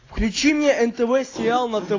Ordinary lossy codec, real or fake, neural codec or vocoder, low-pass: AAC, 32 kbps; real; none; 7.2 kHz